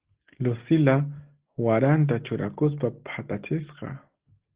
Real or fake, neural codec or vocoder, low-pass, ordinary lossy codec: real; none; 3.6 kHz; Opus, 16 kbps